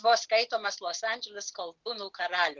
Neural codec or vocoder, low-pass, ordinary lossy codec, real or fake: none; 7.2 kHz; Opus, 16 kbps; real